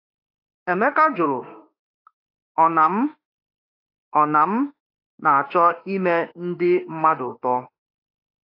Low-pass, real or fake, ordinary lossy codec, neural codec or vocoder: 5.4 kHz; fake; AAC, 32 kbps; autoencoder, 48 kHz, 32 numbers a frame, DAC-VAE, trained on Japanese speech